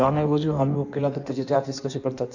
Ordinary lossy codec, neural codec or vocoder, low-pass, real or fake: none; codec, 16 kHz in and 24 kHz out, 1.1 kbps, FireRedTTS-2 codec; 7.2 kHz; fake